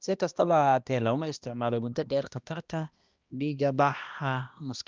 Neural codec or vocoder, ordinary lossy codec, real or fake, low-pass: codec, 16 kHz, 1 kbps, X-Codec, HuBERT features, trained on balanced general audio; Opus, 24 kbps; fake; 7.2 kHz